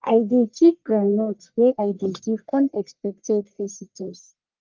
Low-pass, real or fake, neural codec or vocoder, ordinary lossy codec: 7.2 kHz; fake; codec, 44.1 kHz, 1.7 kbps, Pupu-Codec; Opus, 32 kbps